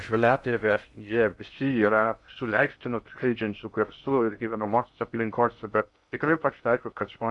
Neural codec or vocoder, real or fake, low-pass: codec, 16 kHz in and 24 kHz out, 0.6 kbps, FocalCodec, streaming, 2048 codes; fake; 10.8 kHz